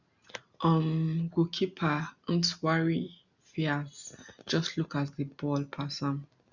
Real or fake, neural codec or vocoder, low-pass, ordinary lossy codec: real; none; 7.2 kHz; none